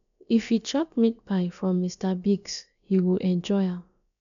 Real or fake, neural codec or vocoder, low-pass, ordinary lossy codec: fake; codec, 16 kHz, about 1 kbps, DyCAST, with the encoder's durations; 7.2 kHz; none